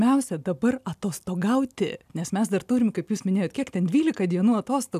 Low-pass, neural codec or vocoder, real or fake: 14.4 kHz; none; real